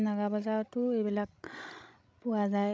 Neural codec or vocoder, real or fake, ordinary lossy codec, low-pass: codec, 16 kHz, 16 kbps, FreqCodec, larger model; fake; none; none